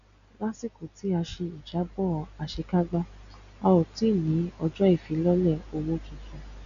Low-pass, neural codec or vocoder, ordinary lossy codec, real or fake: 7.2 kHz; none; none; real